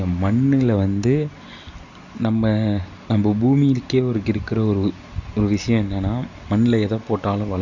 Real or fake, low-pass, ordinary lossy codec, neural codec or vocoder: real; 7.2 kHz; none; none